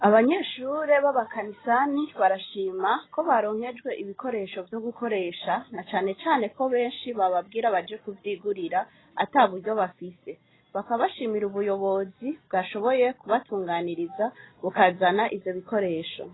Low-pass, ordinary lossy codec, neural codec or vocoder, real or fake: 7.2 kHz; AAC, 16 kbps; none; real